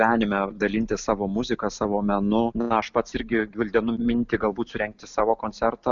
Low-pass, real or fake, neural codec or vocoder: 7.2 kHz; real; none